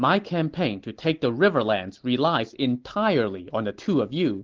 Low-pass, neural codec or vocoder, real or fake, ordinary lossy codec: 7.2 kHz; none; real; Opus, 16 kbps